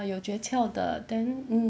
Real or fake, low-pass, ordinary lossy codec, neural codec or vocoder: real; none; none; none